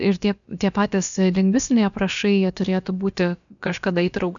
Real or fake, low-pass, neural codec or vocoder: fake; 7.2 kHz; codec, 16 kHz, about 1 kbps, DyCAST, with the encoder's durations